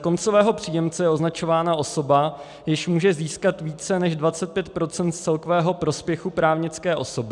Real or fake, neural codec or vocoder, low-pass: real; none; 10.8 kHz